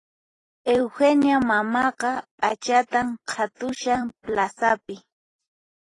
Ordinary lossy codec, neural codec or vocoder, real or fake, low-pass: AAC, 32 kbps; vocoder, 44.1 kHz, 128 mel bands every 256 samples, BigVGAN v2; fake; 10.8 kHz